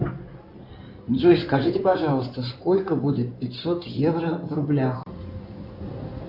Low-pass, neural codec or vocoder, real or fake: 5.4 kHz; codec, 16 kHz in and 24 kHz out, 2.2 kbps, FireRedTTS-2 codec; fake